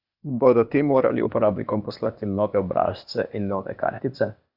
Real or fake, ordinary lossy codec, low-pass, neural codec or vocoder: fake; none; 5.4 kHz; codec, 16 kHz, 0.8 kbps, ZipCodec